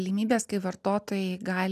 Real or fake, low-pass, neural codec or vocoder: real; 14.4 kHz; none